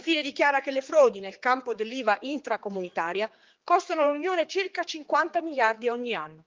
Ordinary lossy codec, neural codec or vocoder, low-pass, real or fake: Opus, 32 kbps; codec, 16 kHz, 4 kbps, X-Codec, HuBERT features, trained on general audio; 7.2 kHz; fake